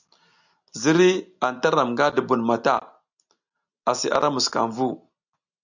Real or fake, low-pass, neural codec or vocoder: real; 7.2 kHz; none